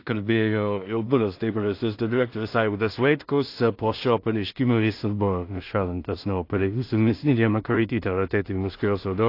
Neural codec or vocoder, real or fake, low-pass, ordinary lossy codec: codec, 16 kHz in and 24 kHz out, 0.4 kbps, LongCat-Audio-Codec, two codebook decoder; fake; 5.4 kHz; AAC, 32 kbps